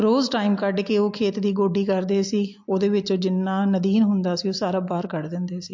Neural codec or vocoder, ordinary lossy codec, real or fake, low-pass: none; MP3, 48 kbps; real; 7.2 kHz